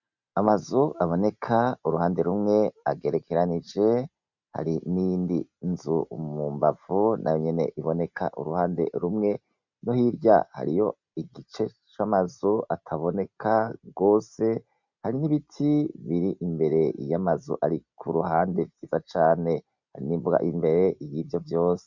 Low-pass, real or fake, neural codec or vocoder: 7.2 kHz; real; none